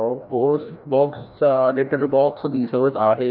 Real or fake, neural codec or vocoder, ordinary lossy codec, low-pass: fake; codec, 16 kHz, 1 kbps, FreqCodec, larger model; none; 5.4 kHz